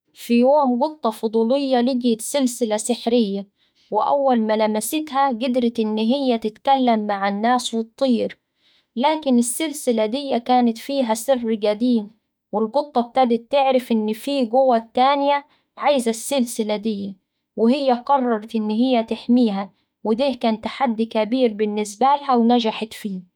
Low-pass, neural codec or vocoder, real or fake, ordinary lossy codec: none; autoencoder, 48 kHz, 32 numbers a frame, DAC-VAE, trained on Japanese speech; fake; none